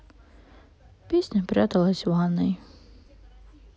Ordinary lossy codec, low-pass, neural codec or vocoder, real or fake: none; none; none; real